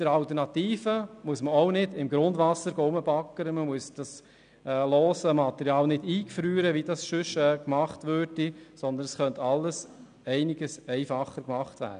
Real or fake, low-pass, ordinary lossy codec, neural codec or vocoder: real; 9.9 kHz; none; none